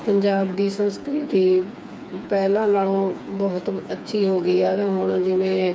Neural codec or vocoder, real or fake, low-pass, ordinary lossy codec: codec, 16 kHz, 4 kbps, FreqCodec, smaller model; fake; none; none